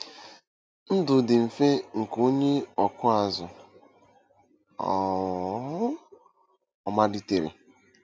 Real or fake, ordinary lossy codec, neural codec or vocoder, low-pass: real; none; none; none